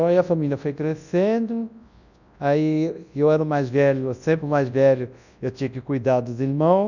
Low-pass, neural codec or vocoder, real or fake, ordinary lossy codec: 7.2 kHz; codec, 24 kHz, 0.9 kbps, WavTokenizer, large speech release; fake; none